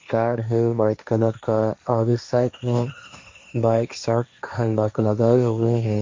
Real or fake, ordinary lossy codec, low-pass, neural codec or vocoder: fake; MP3, 48 kbps; 7.2 kHz; codec, 16 kHz, 1.1 kbps, Voila-Tokenizer